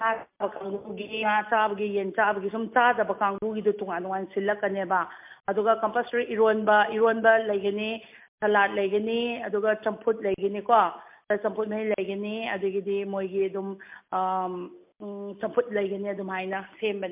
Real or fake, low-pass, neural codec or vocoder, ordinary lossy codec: real; 3.6 kHz; none; MP3, 32 kbps